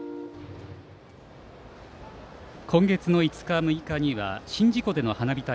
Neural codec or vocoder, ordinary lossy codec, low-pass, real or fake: none; none; none; real